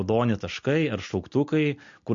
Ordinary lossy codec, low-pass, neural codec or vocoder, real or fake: MP3, 48 kbps; 7.2 kHz; none; real